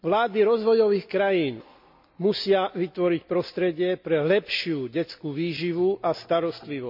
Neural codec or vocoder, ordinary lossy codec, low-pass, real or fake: none; MP3, 48 kbps; 5.4 kHz; real